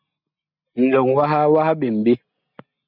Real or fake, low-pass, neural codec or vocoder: real; 5.4 kHz; none